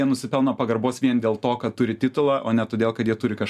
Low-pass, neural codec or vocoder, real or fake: 14.4 kHz; none; real